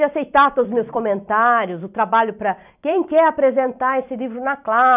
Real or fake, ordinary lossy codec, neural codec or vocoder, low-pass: fake; none; vocoder, 44.1 kHz, 128 mel bands every 256 samples, BigVGAN v2; 3.6 kHz